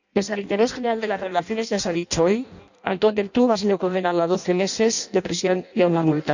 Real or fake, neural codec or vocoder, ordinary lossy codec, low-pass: fake; codec, 16 kHz in and 24 kHz out, 0.6 kbps, FireRedTTS-2 codec; none; 7.2 kHz